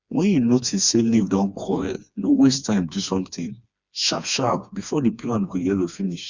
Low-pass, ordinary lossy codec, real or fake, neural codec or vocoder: 7.2 kHz; Opus, 64 kbps; fake; codec, 16 kHz, 2 kbps, FreqCodec, smaller model